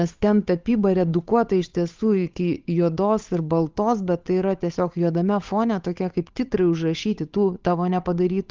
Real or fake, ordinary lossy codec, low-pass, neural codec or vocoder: fake; Opus, 32 kbps; 7.2 kHz; codec, 16 kHz, 8 kbps, FunCodec, trained on Chinese and English, 25 frames a second